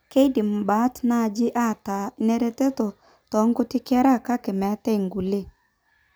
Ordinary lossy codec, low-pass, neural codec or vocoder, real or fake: none; none; none; real